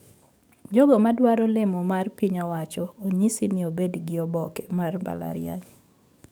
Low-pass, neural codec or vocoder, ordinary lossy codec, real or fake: none; codec, 44.1 kHz, 7.8 kbps, DAC; none; fake